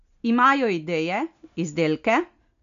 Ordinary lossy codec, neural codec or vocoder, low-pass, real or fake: none; none; 7.2 kHz; real